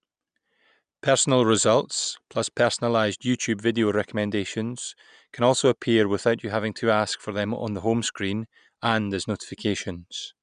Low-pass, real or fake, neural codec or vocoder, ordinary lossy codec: 9.9 kHz; real; none; none